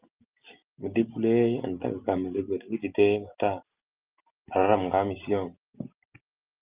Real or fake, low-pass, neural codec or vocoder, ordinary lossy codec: real; 3.6 kHz; none; Opus, 32 kbps